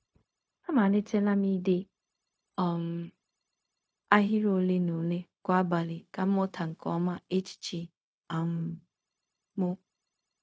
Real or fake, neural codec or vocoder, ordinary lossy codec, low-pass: fake; codec, 16 kHz, 0.4 kbps, LongCat-Audio-Codec; none; none